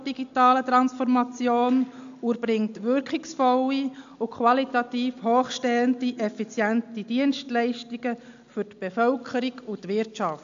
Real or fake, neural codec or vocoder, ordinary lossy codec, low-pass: real; none; AAC, 64 kbps; 7.2 kHz